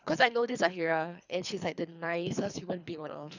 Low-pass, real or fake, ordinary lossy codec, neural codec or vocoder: 7.2 kHz; fake; none; codec, 24 kHz, 3 kbps, HILCodec